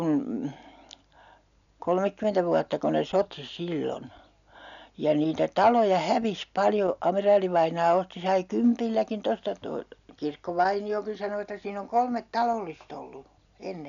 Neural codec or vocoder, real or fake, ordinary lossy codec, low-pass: none; real; none; 7.2 kHz